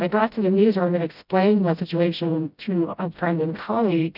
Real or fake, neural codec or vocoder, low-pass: fake; codec, 16 kHz, 0.5 kbps, FreqCodec, smaller model; 5.4 kHz